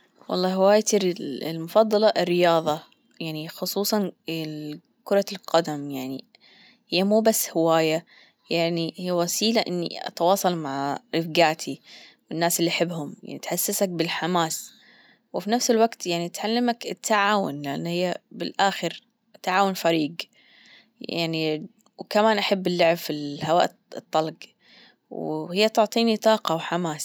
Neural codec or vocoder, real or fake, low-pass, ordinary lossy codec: none; real; none; none